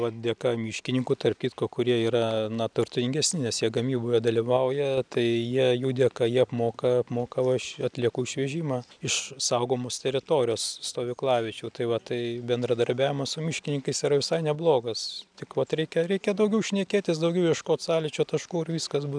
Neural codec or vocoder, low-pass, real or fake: none; 9.9 kHz; real